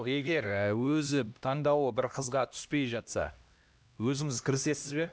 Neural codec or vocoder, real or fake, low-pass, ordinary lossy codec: codec, 16 kHz, 1 kbps, X-Codec, HuBERT features, trained on LibriSpeech; fake; none; none